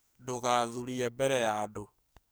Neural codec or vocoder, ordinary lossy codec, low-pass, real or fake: codec, 44.1 kHz, 2.6 kbps, SNAC; none; none; fake